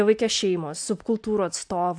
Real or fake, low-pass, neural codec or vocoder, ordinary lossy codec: real; 9.9 kHz; none; AAC, 64 kbps